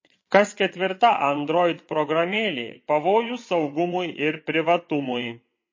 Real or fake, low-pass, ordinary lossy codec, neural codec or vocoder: fake; 7.2 kHz; MP3, 32 kbps; vocoder, 22.05 kHz, 80 mel bands, WaveNeXt